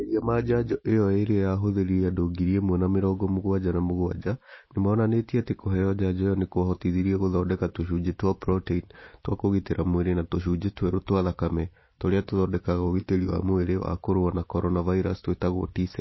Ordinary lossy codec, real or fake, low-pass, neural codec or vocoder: MP3, 24 kbps; real; 7.2 kHz; none